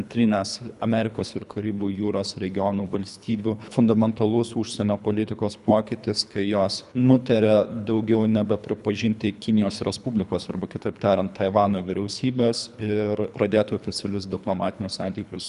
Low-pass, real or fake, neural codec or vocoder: 10.8 kHz; fake; codec, 24 kHz, 3 kbps, HILCodec